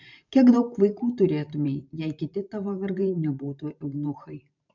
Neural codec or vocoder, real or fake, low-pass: vocoder, 44.1 kHz, 128 mel bands every 512 samples, BigVGAN v2; fake; 7.2 kHz